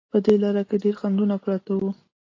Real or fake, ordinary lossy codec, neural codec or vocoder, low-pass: real; AAC, 32 kbps; none; 7.2 kHz